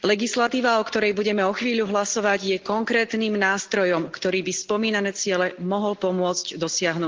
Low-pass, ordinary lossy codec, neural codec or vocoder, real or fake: 7.2 kHz; Opus, 16 kbps; none; real